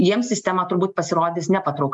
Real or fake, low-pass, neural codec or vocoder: real; 10.8 kHz; none